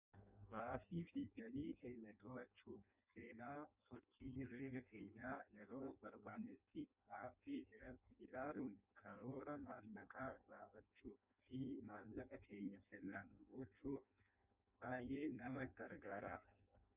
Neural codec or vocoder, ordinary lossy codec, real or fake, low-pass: codec, 16 kHz in and 24 kHz out, 0.6 kbps, FireRedTTS-2 codec; Opus, 64 kbps; fake; 3.6 kHz